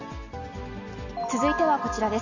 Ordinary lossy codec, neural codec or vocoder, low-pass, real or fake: none; none; 7.2 kHz; real